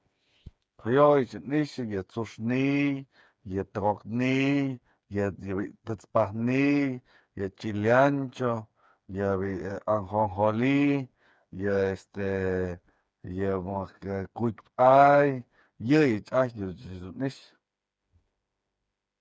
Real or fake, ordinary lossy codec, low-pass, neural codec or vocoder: fake; none; none; codec, 16 kHz, 4 kbps, FreqCodec, smaller model